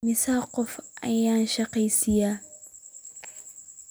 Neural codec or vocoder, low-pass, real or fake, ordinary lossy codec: none; none; real; none